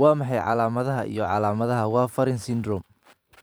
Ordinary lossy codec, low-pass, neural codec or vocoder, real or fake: none; none; vocoder, 44.1 kHz, 128 mel bands every 512 samples, BigVGAN v2; fake